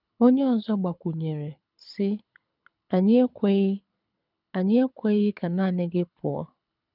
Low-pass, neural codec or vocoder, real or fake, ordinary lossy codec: 5.4 kHz; codec, 24 kHz, 6 kbps, HILCodec; fake; none